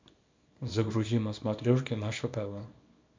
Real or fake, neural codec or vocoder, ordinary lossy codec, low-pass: fake; codec, 24 kHz, 0.9 kbps, WavTokenizer, small release; MP3, 64 kbps; 7.2 kHz